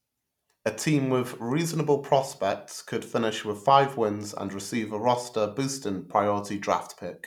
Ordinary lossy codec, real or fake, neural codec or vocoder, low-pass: none; real; none; 19.8 kHz